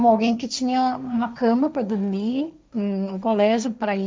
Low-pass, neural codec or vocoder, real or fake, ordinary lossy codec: none; codec, 16 kHz, 1.1 kbps, Voila-Tokenizer; fake; none